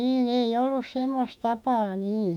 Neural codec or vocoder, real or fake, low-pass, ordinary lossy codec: autoencoder, 48 kHz, 32 numbers a frame, DAC-VAE, trained on Japanese speech; fake; 19.8 kHz; none